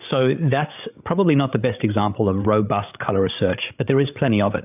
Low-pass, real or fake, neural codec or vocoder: 3.6 kHz; fake; codec, 16 kHz, 8 kbps, FreqCodec, larger model